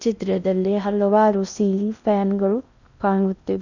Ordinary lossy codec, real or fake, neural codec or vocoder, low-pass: none; fake; codec, 16 kHz in and 24 kHz out, 0.6 kbps, FocalCodec, streaming, 4096 codes; 7.2 kHz